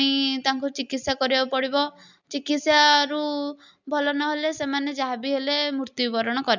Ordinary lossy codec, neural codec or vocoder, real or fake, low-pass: none; none; real; 7.2 kHz